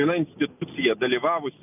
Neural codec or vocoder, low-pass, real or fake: none; 3.6 kHz; real